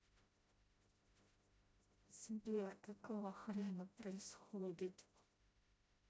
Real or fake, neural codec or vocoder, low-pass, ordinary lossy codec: fake; codec, 16 kHz, 0.5 kbps, FreqCodec, smaller model; none; none